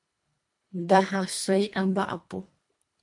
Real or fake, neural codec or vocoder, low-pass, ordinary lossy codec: fake; codec, 24 kHz, 1.5 kbps, HILCodec; 10.8 kHz; MP3, 64 kbps